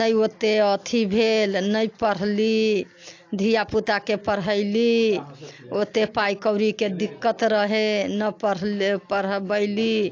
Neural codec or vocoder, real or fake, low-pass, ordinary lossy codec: none; real; 7.2 kHz; AAC, 48 kbps